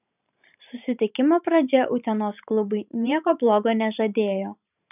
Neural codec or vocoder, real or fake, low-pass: vocoder, 44.1 kHz, 128 mel bands every 512 samples, BigVGAN v2; fake; 3.6 kHz